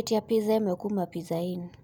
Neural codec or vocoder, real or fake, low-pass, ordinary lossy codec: none; real; 19.8 kHz; none